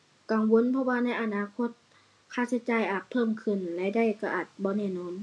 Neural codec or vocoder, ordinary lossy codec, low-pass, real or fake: none; none; none; real